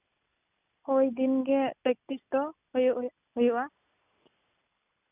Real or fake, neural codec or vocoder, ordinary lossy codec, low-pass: real; none; none; 3.6 kHz